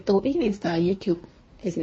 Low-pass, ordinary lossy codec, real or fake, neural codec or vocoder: 9.9 kHz; MP3, 32 kbps; fake; codec, 24 kHz, 1 kbps, SNAC